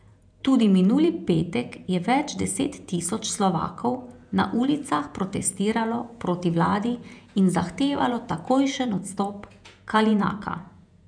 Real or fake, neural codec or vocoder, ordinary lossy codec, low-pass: fake; vocoder, 48 kHz, 128 mel bands, Vocos; none; 9.9 kHz